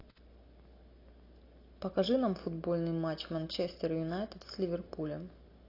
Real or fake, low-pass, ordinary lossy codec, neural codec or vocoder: real; 5.4 kHz; AAC, 32 kbps; none